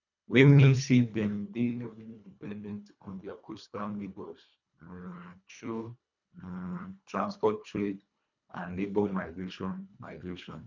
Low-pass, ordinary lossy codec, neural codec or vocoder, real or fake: 7.2 kHz; none; codec, 24 kHz, 1.5 kbps, HILCodec; fake